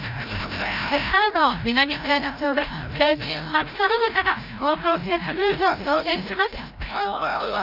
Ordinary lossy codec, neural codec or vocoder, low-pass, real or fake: Opus, 64 kbps; codec, 16 kHz, 0.5 kbps, FreqCodec, larger model; 5.4 kHz; fake